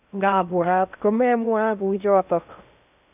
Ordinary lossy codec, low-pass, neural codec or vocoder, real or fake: none; 3.6 kHz; codec, 16 kHz in and 24 kHz out, 0.6 kbps, FocalCodec, streaming, 2048 codes; fake